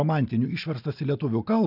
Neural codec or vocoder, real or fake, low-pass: none; real; 5.4 kHz